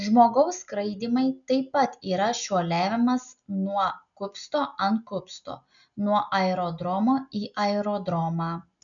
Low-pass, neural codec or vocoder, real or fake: 7.2 kHz; none; real